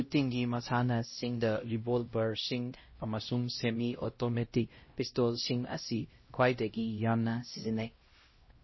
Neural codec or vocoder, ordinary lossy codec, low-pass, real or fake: codec, 16 kHz, 0.5 kbps, X-Codec, HuBERT features, trained on LibriSpeech; MP3, 24 kbps; 7.2 kHz; fake